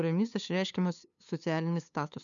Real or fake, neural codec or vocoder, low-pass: fake; codec, 16 kHz, 2 kbps, FunCodec, trained on LibriTTS, 25 frames a second; 7.2 kHz